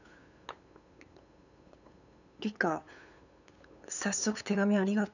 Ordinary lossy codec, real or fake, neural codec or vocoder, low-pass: none; fake; codec, 16 kHz, 8 kbps, FunCodec, trained on LibriTTS, 25 frames a second; 7.2 kHz